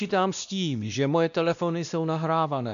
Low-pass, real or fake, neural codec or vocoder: 7.2 kHz; fake; codec, 16 kHz, 1 kbps, X-Codec, WavLM features, trained on Multilingual LibriSpeech